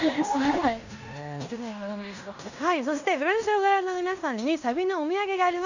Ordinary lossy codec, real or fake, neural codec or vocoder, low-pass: none; fake; codec, 16 kHz in and 24 kHz out, 0.9 kbps, LongCat-Audio-Codec, fine tuned four codebook decoder; 7.2 kHz